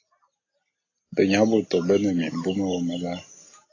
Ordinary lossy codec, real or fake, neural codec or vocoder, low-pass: AAC, 32 kbps; real; none; 7.2 kHz